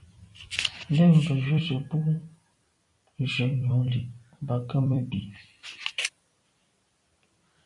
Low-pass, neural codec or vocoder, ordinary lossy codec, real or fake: 10.8 kHz; vocoder, 44.1 kHz, 128 mel bands every 256 samples, BigVGAN v2; AAC, 64 kbps; fake